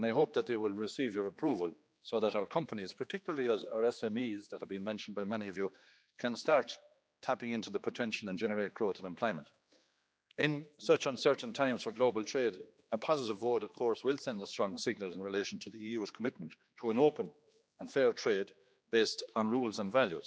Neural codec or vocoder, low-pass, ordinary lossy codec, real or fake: codec, 16 kHz, 2 kbps, X-Codec, HuBERT features, trained on general audio; none; none; fake